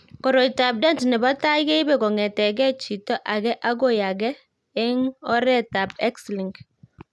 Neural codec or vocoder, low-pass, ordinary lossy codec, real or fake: none; none; none; real